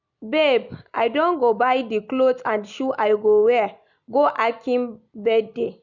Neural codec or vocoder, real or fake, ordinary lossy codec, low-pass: none; real; none; 7.2 kHz